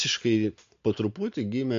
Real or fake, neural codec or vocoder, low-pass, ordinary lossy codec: real; none; 7.2 kHz; MP3, 48 kbps